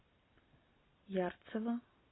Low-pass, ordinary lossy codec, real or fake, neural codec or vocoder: 7.2 kHz; AAC, 16 kbps; real; none